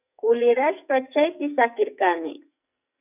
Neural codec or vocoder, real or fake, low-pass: codec, 44.1 kHz, 2.6 kbps, SNAC; fake; 3.6 kHz